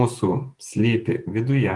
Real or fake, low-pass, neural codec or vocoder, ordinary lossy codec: fake; 10.8 kHz; vocoder, 48 kHz, 128 mel bands, Vocos; Opus, 24 kbps